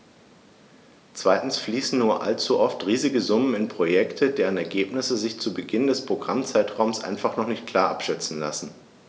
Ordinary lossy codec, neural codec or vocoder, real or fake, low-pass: none; none; real; none